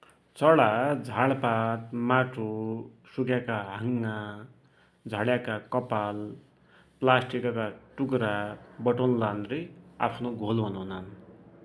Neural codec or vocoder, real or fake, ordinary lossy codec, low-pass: none; real; none; none